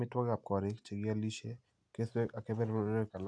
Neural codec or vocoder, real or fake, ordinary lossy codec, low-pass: none; real; AAC, 64 kbps; 9.9 kHz